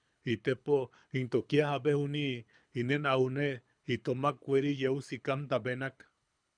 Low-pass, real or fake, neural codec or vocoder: 9.9 kHz; fake; codec, 24 kHz, 6 kbps, HILCodec